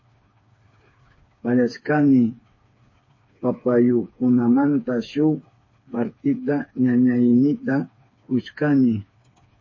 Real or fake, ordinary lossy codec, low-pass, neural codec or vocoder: fake; MP3, 32 kbps; 7.2 kHz; codec, 16 kHz, 4 kbps, FreqCodec, smaller model